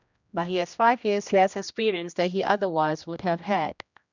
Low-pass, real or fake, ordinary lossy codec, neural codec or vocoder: 7.2 kHz; fake; none; codec, 16 kHz, 1 kbps, X-Codec, HuBERT features, trained on general audio